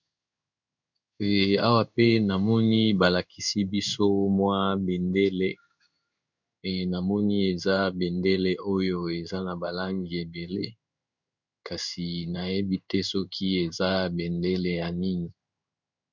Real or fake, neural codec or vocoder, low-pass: fake; codec, 16 kHz in and 24 kHz out, 1 kbps, XY-Tokenizer; 7.2 kHz